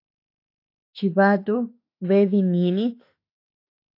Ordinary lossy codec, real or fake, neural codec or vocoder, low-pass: AAC, 32 kbps; fake; autoencoder, 48 kHz, 32 numbers a frame, DAC-VAE, trained on Japanese speech; 5.4 kHz